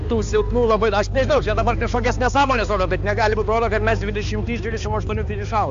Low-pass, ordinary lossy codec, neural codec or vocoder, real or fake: 7.2 kHz; AAC, 64 kbps; codec, 16 kHz, 2 kbps, X-Codec, HuBERT features, trained on balanced general audio; fake